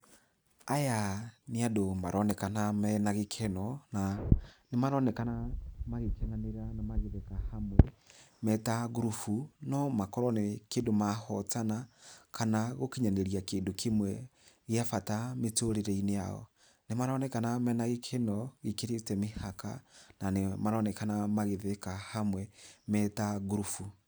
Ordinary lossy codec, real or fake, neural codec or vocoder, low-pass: none; real; none; none